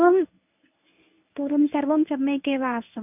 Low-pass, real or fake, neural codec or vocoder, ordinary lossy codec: 3.6 kHz; fake; codec, 24 kHz, 0.9 kbps, WavTokenizer, medium speech release version 1; none